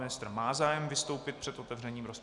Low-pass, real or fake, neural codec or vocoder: 10.8 kHz; real; none